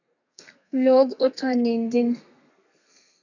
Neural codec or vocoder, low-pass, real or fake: codec, 32 kHz, 1.9 kbps, SNAC; 7.2 kHz; fake